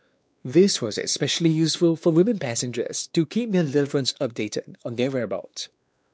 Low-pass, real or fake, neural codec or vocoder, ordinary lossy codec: none; fake; codec, 16 kHz, 2 kbps, X-Codec, WavLM features, trained on Multilingual LibriSpeech; none